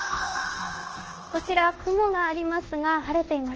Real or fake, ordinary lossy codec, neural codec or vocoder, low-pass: fake; Opus, 16 kbps; codec, 24 kHz, 1.2 kbps, DualCodec; 7.2 kHz